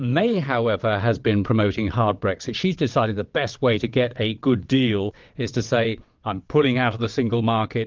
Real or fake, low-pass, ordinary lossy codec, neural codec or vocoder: fake; 7.2 kHz; Opus, 32 kbps; vocoder, 22.05 kHz, 80 mel bands, WaveNeXt